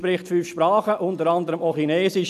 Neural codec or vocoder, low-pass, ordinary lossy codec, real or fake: none; 14.4 kHz; none; real